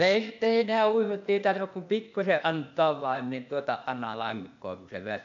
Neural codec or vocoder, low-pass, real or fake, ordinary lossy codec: codec, 16 kHz, 0.8 kbps, ZipCodec; 7.2 kHz; fake; AAC, 64 kbps